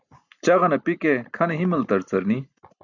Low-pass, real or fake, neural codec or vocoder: 7.2 kHz; real; none